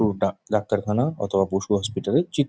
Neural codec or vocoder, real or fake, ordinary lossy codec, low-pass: none; real; none; none